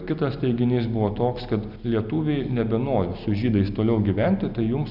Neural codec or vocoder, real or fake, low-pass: none; real; 5.4 kHz